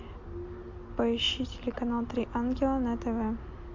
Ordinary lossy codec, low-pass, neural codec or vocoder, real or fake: MP3, 48 kbps; 7.2 kHz; none; real